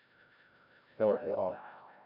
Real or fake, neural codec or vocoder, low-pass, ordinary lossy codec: fake; codec, 16 kHz, 0.5 kbps, FreqCodec, larger model; 5.4 kHz; none